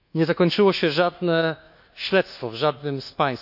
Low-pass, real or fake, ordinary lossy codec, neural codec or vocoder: 5.4 kHz; fake; none; codec, 24 kHz, 1.2 kbps, DualCodec